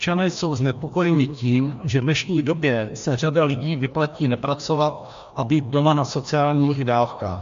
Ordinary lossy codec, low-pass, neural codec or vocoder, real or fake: AAC, 64 kbps; 7.2 kHz; codec, 16 kHz, 1 kbps, FreqCodec, larger model; fake